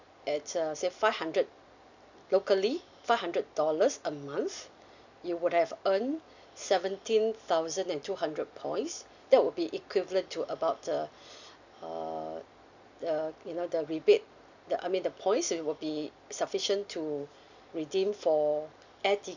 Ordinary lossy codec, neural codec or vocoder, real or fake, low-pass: none; none; real; 7.2 kHz